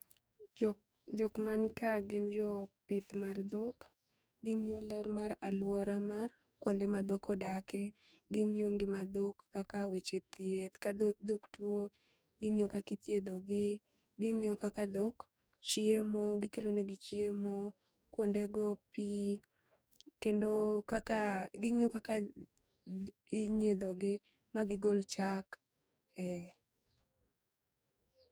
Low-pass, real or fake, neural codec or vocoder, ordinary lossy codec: none; fake; codec, 44.1 kHz, 2.6 kbps, DAC; none